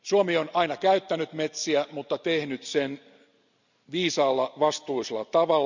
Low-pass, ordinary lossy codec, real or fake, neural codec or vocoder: 7.2 kHz; none; real; none